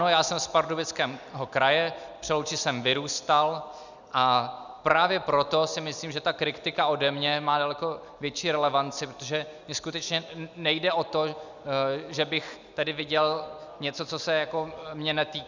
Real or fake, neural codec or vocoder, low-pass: real; none; 7.2 kHz